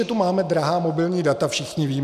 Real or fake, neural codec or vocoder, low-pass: real; none; 14.4 kHz